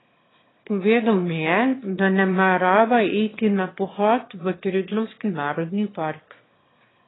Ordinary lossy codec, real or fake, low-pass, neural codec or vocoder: AAC, 16 kbps; fake; 7.2 kHz; autoencoder, 22.05 kHz, a latent of 192 numbers a frame, VITS, trained on one speaker